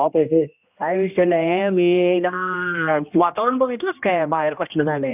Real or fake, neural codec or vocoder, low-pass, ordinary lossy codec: fake; codec, 16 kHz, 1 kbps, X-Codec, HuBERT features, trained on general audio; 3.6 kHz; none